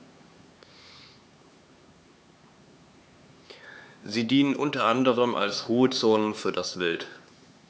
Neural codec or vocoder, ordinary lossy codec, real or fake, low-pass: codec, 16 kHz, 4 kbps, X-Codec, HuBERT features, trained on LibriSpeech; none; fake; none